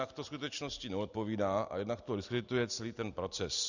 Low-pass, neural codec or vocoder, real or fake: 7.2 kHz; none; real